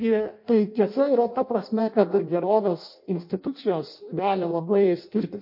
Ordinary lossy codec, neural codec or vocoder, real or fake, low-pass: MP3, 32 kbps; codec, 16 kHz in and 24 kHz out, 0.6 kbps, FireRedTTS-2 codec; fake; 5.4 kHz